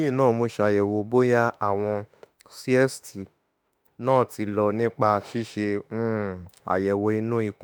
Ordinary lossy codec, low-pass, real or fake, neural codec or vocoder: none; none; fake; autoencoder, 48 kHz, 32 numbers a frame, DAC-VAE, trained on Japanese speech